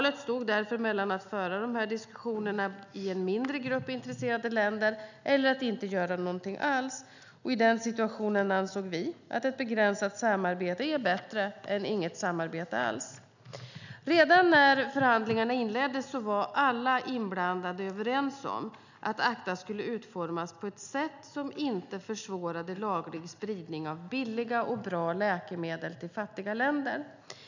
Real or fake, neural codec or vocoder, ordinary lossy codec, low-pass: real; none; none; 7.2 kHz